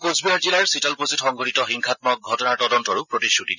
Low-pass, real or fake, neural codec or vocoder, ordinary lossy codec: 7.2 kHz; real; none; none